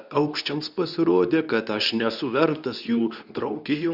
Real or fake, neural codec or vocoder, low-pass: fake; codec, 24 kHz, 0.9 kbps, WavTokenizer, medium speech release version 2; 5.4 kHz